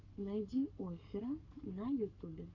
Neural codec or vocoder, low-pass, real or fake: codec, 44.1 kHz, 7.8 kbps, Pupu-Codec; 7.2 kHz; fake